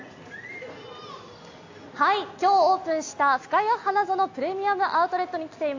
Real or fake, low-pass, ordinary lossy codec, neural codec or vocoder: real; 7.2 kHz; AAC, 48 kbps; none